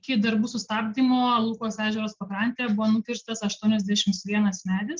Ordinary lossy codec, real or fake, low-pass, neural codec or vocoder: Opus, 16 kbps; real; 7.2 kHz; none